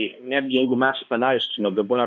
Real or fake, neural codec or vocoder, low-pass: fake; codec, 16 kHz, 1 kbps, X-Codec, HuBERT features, trained on balanced general audio; 7.2 kHz